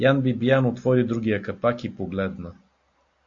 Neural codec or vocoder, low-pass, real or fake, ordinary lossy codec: none; 7.2 kHz; real; AAC, 48 kbps